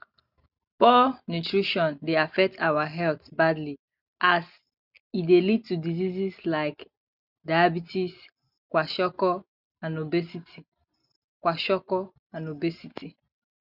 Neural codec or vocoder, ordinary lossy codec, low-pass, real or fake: none; none; 5.4 kHz; real